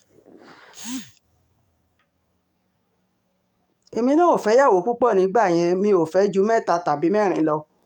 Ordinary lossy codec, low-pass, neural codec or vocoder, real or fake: none; 19.8 kHz; codec, 44.1 kHz, 7.8 kbps, DAC; fake